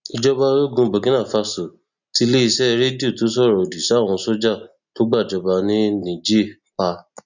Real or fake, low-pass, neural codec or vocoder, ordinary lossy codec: real; 7.2 kHz; none; none